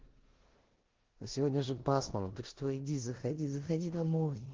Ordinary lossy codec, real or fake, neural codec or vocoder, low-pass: Opus, 16 kbps; fake; codec, 16 kHz in and 24 kHz out, 0.9 kbps, LongCat-Audio-Codec, four codebook decoder; 7.2 kHz